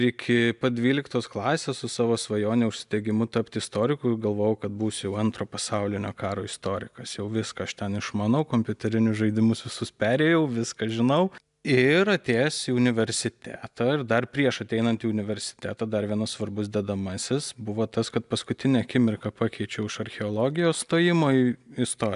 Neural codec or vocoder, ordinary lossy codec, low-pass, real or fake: none; AAC, 96 kbps; 10.8 kHz; real